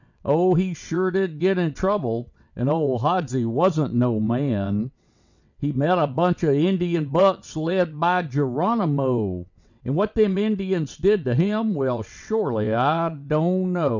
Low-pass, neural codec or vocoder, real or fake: 7.2 kHz; vocoder, 22.05 kHz, 80 mel bands, WaveNeXt; fake